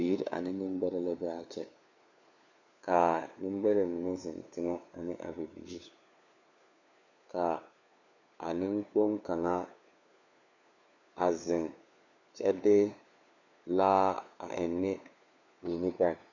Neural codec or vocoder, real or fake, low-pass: codec, 16 kHz, 4 kbps, FunCodec, trained on LibriTTS, 50 frames a second; fake; 7.2 kHz